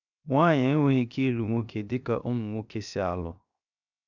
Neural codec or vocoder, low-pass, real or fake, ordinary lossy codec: codec, 16 kHz, 0.7 kbps, FocalCodec; 7.2 kHz; fake; none